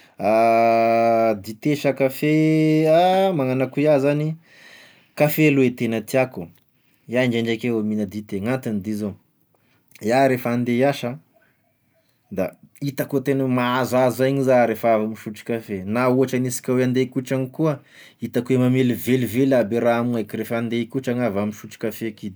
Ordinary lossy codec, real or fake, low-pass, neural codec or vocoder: none; real; none; none